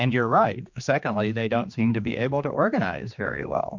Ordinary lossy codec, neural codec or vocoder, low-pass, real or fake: MP3, 64 kbps; codec, 16 kHz, 2 kbps, X-Codec, HuBERT features, trained on general audio; 7.2 kHz; fake